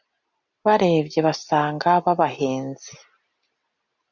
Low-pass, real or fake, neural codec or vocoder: 7.2 kHz; real; none